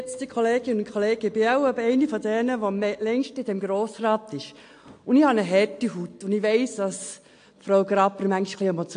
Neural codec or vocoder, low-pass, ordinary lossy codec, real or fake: none; 9.9 kHz; AAC, 48 kbps; real